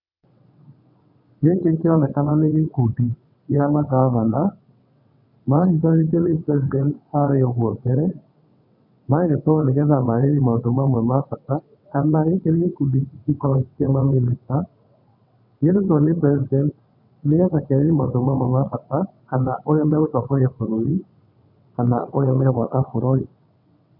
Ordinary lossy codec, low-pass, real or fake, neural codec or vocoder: none; 5.4 kHz; fake; vocoder, 22.05 kHz, 80 mel bands, WaveNeXt